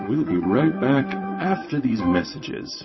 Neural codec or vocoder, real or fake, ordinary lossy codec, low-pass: vocoder, 22.05 kHz, 80 mel bands, WaveNeXt; fake; MP3, 24 kbps; 7.2 kHz